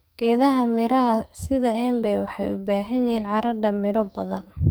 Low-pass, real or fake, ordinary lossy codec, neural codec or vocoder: none; fake; none; codec, 44.1 kHz, 2.6 kbps, SNAC